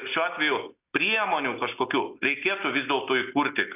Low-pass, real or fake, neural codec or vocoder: 3.6 kHz; real; none